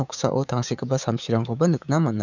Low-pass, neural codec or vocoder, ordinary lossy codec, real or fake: 7.2 kHz; codec, 16 kHz, 6 kbps, DAC; none; fake